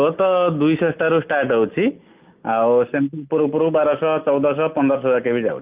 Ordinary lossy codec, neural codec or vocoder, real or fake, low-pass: Opus, 32 kbps; none; real; 3.6 kHz